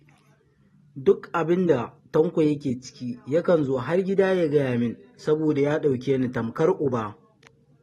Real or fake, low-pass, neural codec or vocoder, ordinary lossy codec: real; 19.8 kHz; none; AAC, 32 kbps